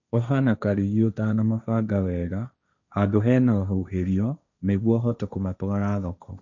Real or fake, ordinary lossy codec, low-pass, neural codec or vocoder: fake; none; 7.2 kHz; codec, 16 kHz, 1.1 kbps, Voila-Tokenizer